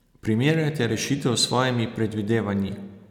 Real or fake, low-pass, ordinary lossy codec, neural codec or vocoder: real; 19.8 kHz; none; none